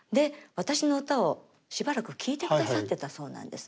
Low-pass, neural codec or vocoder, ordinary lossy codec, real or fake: none; none; none; real